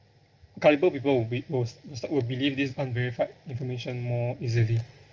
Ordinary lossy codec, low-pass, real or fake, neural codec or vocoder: Opus, 24 kbps; 7.2 kHz; real; none